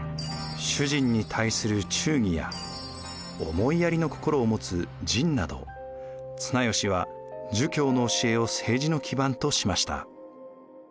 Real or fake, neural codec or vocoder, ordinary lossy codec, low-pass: real; none; none; none